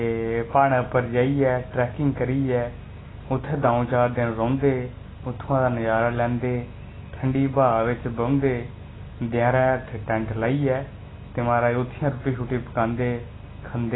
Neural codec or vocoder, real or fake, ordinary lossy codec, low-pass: none; real; AAC, 16 kbps; 7.2 kHz